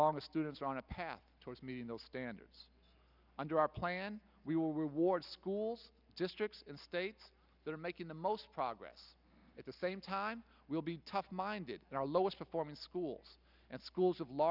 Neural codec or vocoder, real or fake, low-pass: none; real; 5.4 kHz